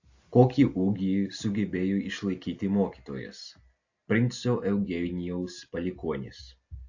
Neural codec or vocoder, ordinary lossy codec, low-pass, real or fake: none; AAC, 48 kbps; 7.2 kHz; real